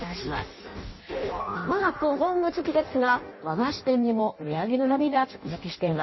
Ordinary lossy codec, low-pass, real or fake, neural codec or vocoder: MP3, 24 kbps; 7.2 kHz; fake; codec, 16 kHz in and 24 kHz out, 0.6 kbps, FireRedTTS-2 codec